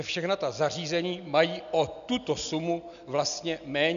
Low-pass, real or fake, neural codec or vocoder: 7.2 kHz; real; none